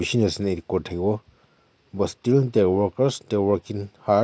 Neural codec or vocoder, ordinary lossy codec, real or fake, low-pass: none; none; real; none